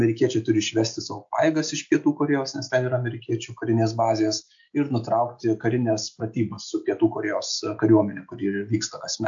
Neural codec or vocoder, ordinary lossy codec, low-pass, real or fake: none; AAC, 64 kbps; 7.2 kHz; real